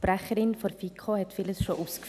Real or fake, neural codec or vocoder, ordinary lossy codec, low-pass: real; none; AAC, 96 kbps; 14.4 kHz